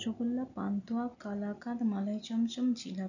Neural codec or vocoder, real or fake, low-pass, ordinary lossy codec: none; real; 7.2 kHz; none